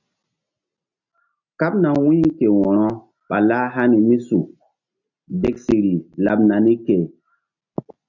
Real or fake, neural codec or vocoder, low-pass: real; none; 7.2 kHz